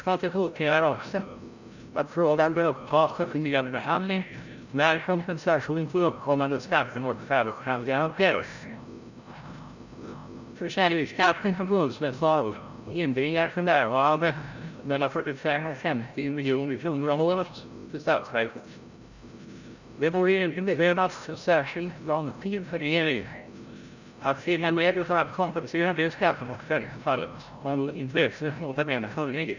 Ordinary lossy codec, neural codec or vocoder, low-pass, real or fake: none; codec, 16 kHz, 0.5 kbps, FreqCodec, larger model; 7.2 kHz; fake